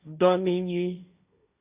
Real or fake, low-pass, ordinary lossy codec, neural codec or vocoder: fake; 3.6 kHz; Opus, 64 kbps; codec, 16 kHz, 1.1 kbps, Voila-Tokenizer